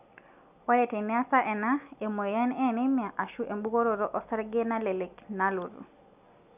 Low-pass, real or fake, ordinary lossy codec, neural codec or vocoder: 3.6 kHz; real; none; none